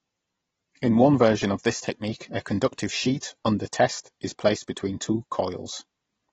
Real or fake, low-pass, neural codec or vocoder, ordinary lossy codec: real; 10.8 kHz; none; AAC, 24 kbps